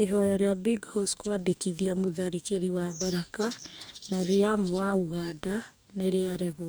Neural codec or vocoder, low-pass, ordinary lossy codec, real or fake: codec, 44.1 kHz, 2.6 kbps, DAC; none; none; fake